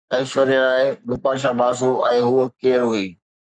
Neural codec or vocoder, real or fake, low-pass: codec, 44.1 kHz, 3.4 kbps, Pupu-Codec; fake; 9.9 kHz